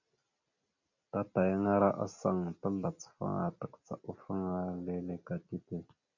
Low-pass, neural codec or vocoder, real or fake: 7.2 kHz; none; real